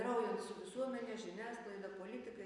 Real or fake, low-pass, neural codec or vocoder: real; 10.8 kHz; none